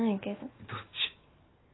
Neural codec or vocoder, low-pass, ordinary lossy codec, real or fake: none; 7.2 kHz; AAC, 16 kbps; real